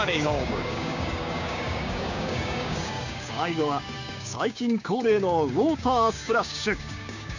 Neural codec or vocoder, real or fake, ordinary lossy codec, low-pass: codec, 16 kHz, 6 kbps, DAC; fake; none; 7.2 kHz